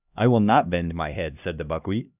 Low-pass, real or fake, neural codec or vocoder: 3.6 kHz; fake; codec, 16 kHz, 1 kbps, X-Codec, HuBERT features, trained on LibriSpeech